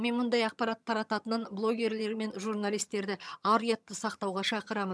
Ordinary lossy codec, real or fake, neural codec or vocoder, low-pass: none; fake; vocoder, 22.05 kHz, 80 mel bands, HiFi-GAN; none